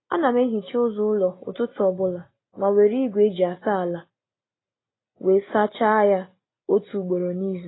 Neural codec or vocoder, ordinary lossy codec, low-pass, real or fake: none; AAC, 16 kbps; 7.2 kHz; real